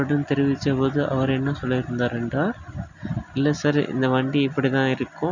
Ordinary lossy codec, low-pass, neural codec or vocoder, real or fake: none; 7.2 kHz; none; real